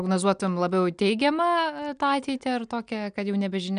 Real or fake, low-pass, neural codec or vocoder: real; 9.9 kHz; none